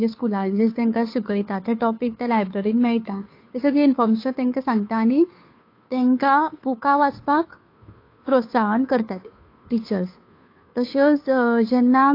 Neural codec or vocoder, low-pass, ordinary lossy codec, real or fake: codec, 16 kHz, 2 kbps, FunCodec, trained on Chinese and English, 25 frames a second; 5.4 kHz; AAC, 32 kbps; fake